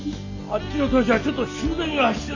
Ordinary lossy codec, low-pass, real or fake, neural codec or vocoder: none; 7.2 kHz; real; none